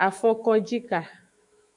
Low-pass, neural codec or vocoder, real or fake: 10.8 kHz; autoencoder, 48 kHz, 128 numbers a frame, DAC-VAE, trained on Japanese speech; fake